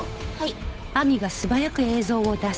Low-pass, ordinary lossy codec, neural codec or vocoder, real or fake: none; none; codec, 16 kHz, 8 kbps, FunCodec, trained on Chinese and English, 25 frames a second; fake